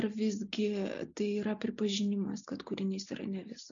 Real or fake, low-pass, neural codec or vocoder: real; 7.2 kHz; none